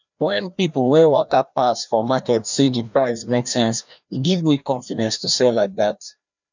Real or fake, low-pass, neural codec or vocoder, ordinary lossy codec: fake; 7.2 kHz; codec, 16 kHz, 1 kbps, FreqCodec, larger model; none